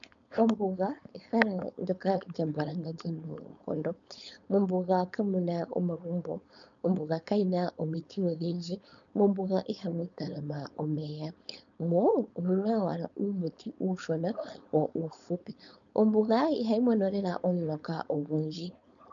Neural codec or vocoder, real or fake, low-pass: codec, 16 kHz, 4.8 kbps, FACodec; fake; 7.2 kHz